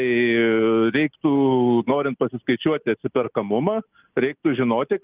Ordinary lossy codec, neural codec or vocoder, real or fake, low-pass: Opus, 32 kbps; none; real; 3.6 kHz